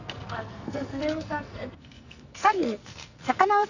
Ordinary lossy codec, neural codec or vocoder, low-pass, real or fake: none; codec, 44.1 kHz, 2.6 kbps, SNAC; 7.2 kHz; fake